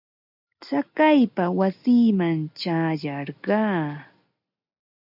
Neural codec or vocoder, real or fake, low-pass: none; real; 5.4 kHz